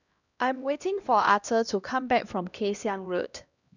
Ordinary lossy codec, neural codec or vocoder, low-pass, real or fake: none; codec, 16 kHz, 1 kbps, X-Codec, HuBERT features, trained on LibriSpeech; 7.2 kHz; fake